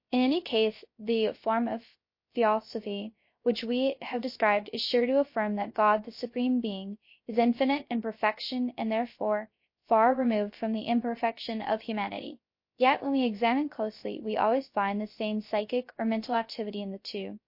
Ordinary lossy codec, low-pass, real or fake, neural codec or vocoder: MP3, 32 kbps; 5.4 kHz; fake; codec, 16 kHz, 0.3 kbps, FocalCodec